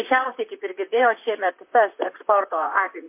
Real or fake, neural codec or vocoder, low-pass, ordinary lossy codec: fake; vocoder, 44.1 kHz, 128 mel bands, Pupu-Vocoder; 3.6 kHz; MP3, 24 kbps